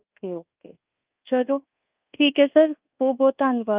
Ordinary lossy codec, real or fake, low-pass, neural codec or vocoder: Opus, 32 kbps; fake; 3.6 kHz; codec, 24 kHz, 1.2 kbps, DualCodec